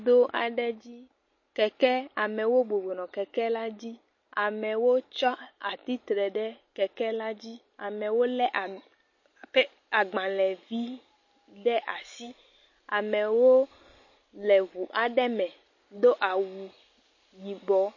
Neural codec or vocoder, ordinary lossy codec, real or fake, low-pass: none; MP3, 32 kbps; real; 7.2 kHz